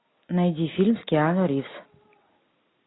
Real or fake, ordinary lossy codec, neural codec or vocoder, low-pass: real; AAC, 16 kbps; none; 7.2 kHz